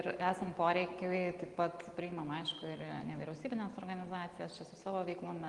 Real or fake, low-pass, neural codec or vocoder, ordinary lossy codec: real; 10.8 kHz; none; Opus, 24 kbps